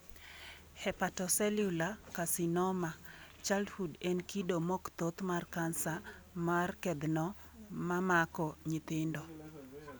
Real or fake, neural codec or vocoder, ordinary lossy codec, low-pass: real; none; none; none